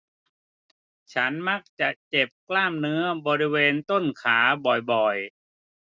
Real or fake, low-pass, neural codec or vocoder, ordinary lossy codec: real; none; none; none